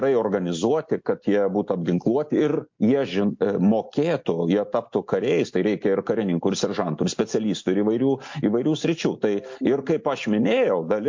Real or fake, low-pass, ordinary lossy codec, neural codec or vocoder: real; 7.2 kHz; MP3, 48 kbps; none